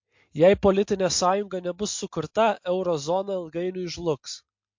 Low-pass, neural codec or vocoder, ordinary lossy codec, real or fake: 7.2 kHz; none; MP3, 48 kbps; real